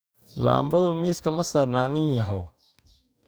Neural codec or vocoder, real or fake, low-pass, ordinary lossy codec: codec, 44.1 kHz, 2.6 kbps, DAC; fake; none; none